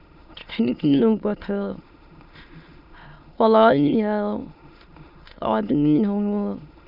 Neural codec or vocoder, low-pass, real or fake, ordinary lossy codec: autoencoder, 22.05 kHz, a latent of 192 numbers a frame, VITS, trained on many speakers; 5.4 kHz; fake; none